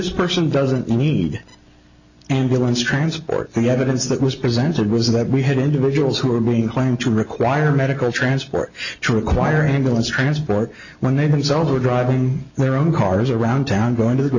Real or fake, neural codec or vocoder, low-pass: real; none; 7.2 kHz